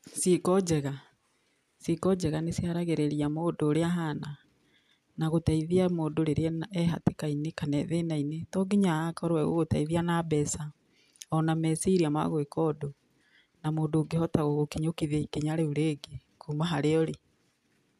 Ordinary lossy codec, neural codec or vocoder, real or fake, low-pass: none; none; real; 14.4 kHz